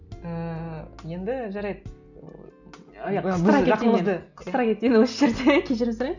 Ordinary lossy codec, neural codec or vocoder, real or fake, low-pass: none; none; real; 7.2 kHz